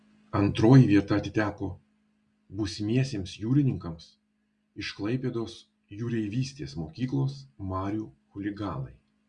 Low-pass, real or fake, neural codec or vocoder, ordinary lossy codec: 9.9 kHz; real; none; Opus, 64 kbps